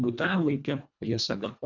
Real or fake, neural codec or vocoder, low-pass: fake; codec, 24 kHz, 1.5 kbps, HILCodec; 7.2 kHz